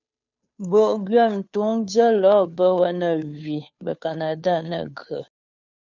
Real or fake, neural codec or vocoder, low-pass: fake; codec, 16 kHz, 2 kbps, FunCodec, trained on Chinese and English, 25 frames a second; 7.2 kHz